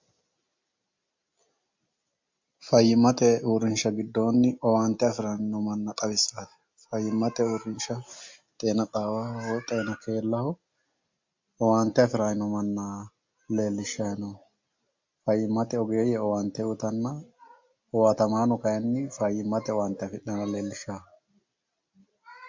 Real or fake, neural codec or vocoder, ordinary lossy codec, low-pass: real; none; MP3, 48 kbps; 7.2 kHz